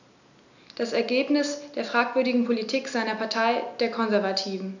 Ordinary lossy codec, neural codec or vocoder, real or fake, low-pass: none; none; real; 7.2 kHz